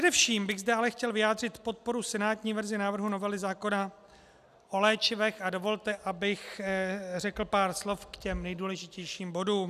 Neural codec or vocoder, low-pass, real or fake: none; 14.4 kHz; real